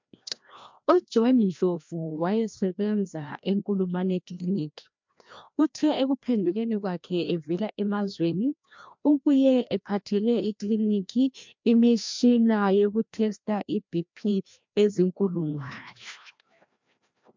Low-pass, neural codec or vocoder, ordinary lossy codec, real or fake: 7.2 kHz; codec, 16 kHz, 1 kbps, FreqCodec, larger model; MP3, 64 kbps; fake